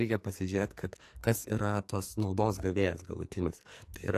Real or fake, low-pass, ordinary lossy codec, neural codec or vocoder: fake; 14.4 kHz; AAC, 64 kbps; codec, 44.1 kHz, 2.6 kbps, SNAC